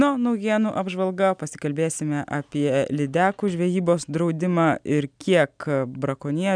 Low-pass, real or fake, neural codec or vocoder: 9.9 kHz; real; none